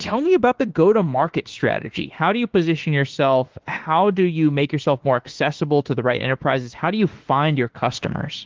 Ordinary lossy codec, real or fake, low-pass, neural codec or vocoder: Opus, 16 kbps; fake; 7.2 kHz; autoencoder, 48 kHz, 32 numbers a frame, DAC-VAE, trained on Japanese speech